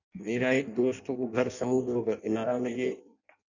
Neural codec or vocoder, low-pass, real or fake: codec, 16 kHz in and 24 kHz out, 0.6 kbps, FireRedTTS-2 codec; 7.2 kHz; fake